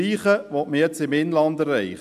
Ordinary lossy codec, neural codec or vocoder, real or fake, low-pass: AAC, 96 kbps; none; real; 14.4 kHz